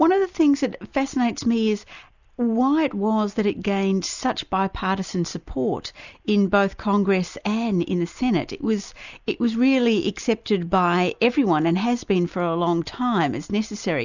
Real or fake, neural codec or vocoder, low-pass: real; none; 7.2 kHz